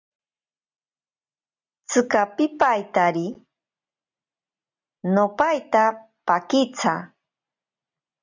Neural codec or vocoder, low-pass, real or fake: none; 7.2 kHz; real